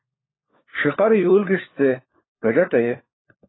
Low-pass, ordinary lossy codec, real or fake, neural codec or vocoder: 7.2 kHz; AAC, 16 kbps; fake; codec, 16 kHz, 4 kbps, FunCodec, trained on LibriTTS, 50 frames a second